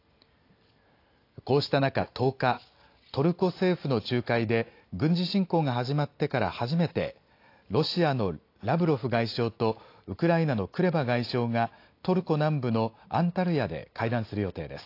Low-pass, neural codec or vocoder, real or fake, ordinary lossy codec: 5.4 kHz; none; real; AAC, 32 kbps